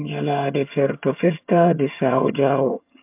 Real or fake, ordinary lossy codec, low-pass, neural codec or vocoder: fake; MP3, 32 kbps; 3.6 kHz; vocoder, 22.05 kHz, 80 mel bands, HiFi-GAN